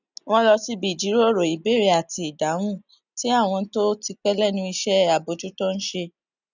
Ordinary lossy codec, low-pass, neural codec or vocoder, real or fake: none; 7.2 kHz; none; real